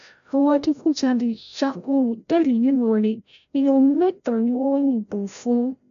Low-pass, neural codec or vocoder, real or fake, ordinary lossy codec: 7.2 kHz; codec, 16 kHz, 0.5 kbps, FreqCodec, larger model; fake; none